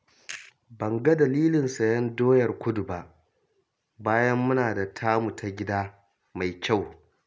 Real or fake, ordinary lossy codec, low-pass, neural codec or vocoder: real; none; none; none